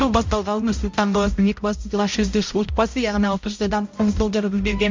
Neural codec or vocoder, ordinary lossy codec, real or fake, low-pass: codec, 16 kHz, 0.5 kbps, X-Codec, HuBERT features, trained on balanced general audio; MP3, 48 kbps; fake; 7.2 kHz